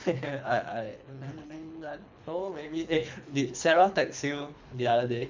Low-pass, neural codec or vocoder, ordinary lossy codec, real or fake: 7.2 kHz; codec, 24 kHz, 3 kbps, HILCodec; MP3, 64 kbps; fake